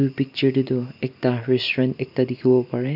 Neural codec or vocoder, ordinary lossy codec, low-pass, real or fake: none; none; 5.4 kHz; real